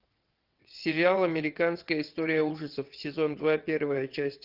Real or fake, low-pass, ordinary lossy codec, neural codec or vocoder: fake; 5.4 kHz; Opus, 32 kbps; vocoder, 22.05 kHz, 80 mel bands, WaveNeXt